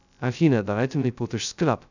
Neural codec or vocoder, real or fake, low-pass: codec, 16 kHz, 0.2 kbps, FocalCodec; fake; 7.2 kHz